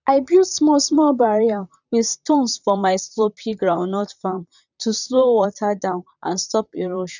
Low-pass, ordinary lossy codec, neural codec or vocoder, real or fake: 7.2 kHz; none; vocoder, 44.1 kHz, 128 mel bands, Pupu-Vocoder; fake